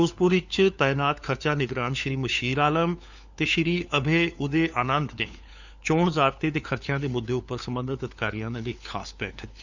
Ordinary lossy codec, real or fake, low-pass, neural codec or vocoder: none; fake; 7.2 kHz; codec, 16 kHz, 4 kbps, FunCodec, trained on LibriTTS, 50 frames a second